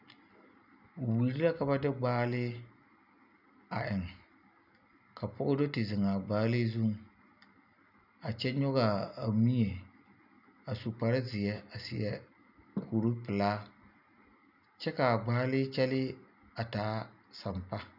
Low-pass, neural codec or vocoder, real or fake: 5.4 kHz; none; real